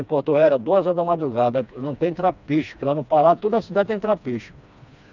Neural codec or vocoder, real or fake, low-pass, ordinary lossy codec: codec, 16 kHz, 2 kbps, FreqCodec, smaller model; fake; 7.2 kHz; none